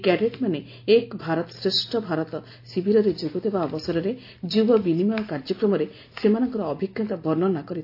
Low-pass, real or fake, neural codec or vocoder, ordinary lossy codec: 5.4 kHz; real; none; AAC, 32 kbps